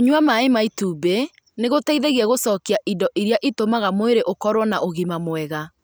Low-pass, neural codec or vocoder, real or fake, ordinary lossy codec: none; none; real; none